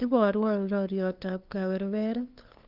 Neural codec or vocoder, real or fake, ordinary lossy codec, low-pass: codec, 16 kHz, 2 kbps, FunCodec, trained on LibriTTS, 25 frames a second; fake; Opus, 24 kbps; 5.4 kHz